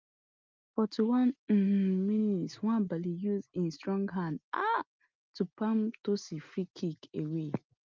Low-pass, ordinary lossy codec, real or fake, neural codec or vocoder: 7.2 kHz; Opus, 24 kbps; real; none